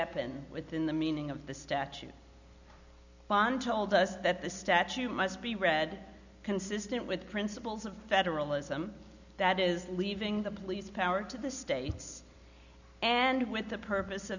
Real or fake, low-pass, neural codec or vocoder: real; 7.2 kHz; none